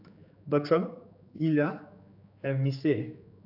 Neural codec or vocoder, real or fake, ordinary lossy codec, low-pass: codec, 16 kHz, 4 kbps, X-Codec, HuBERT features, trained on general audio; fake; AAC, 48 kbps; 5.4 kHz